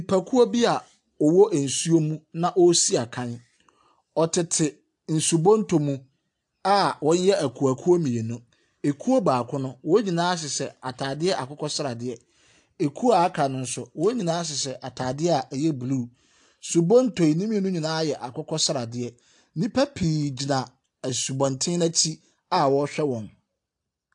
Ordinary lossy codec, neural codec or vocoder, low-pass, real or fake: AAC, 64 kbps; none; 10.8 kHz; real